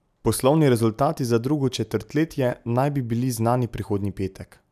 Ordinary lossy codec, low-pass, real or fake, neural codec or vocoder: none; 14.4 kHz; real; none